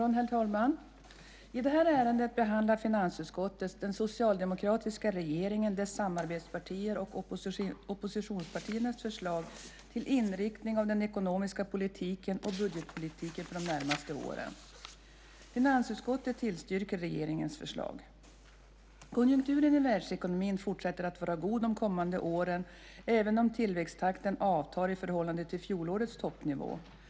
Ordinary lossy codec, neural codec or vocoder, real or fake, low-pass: none; none; real; none